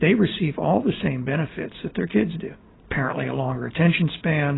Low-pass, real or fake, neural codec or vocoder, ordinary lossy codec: 7.2 kHz; real; none; AAC, 16 kbps